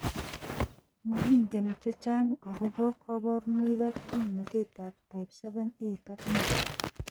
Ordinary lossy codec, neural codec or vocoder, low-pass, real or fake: none; codec, 44.1 kHz, 1.7 kbps, Pupu-Codec; none; fake